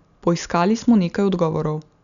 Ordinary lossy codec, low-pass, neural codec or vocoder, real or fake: none; 7.2 kHz; none; real